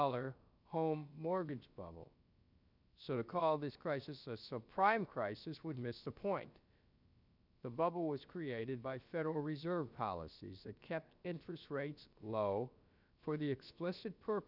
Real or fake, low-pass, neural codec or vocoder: fake; 5.4 kHz; codec, 16 kHz, about 1 kbps, DyCAST, with the encoder's durations